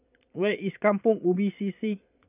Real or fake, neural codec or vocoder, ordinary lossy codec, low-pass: fake; vocoder, 22.05 kHz, 80 mel bands, Vocos; none; 3.6 kHz